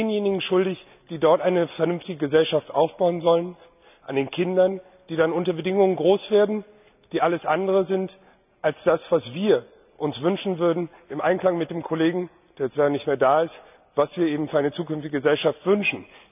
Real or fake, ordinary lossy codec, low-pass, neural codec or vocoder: real; none; 3.6 kHz; none